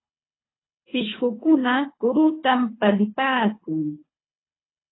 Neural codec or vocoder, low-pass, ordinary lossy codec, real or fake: codec, 24 kHz, 3 kbps, HILCodec; 7.2 kHz; AAC, 16 kbps; fake